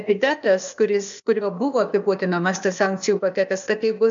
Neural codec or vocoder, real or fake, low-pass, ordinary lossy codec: codec, 16 kHz, 0.8 kbps, ZipCodec; fake; 7.2 kHz; MP3, 64 kbps